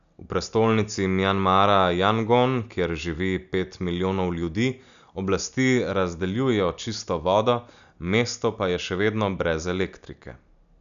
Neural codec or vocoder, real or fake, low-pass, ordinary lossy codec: none; real; 7.2 kHz; none